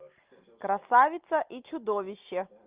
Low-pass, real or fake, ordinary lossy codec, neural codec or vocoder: 3.6 kHz; real; Opus, 24 kbps; none